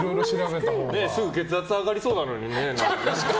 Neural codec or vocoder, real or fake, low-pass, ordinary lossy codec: none; real; none; none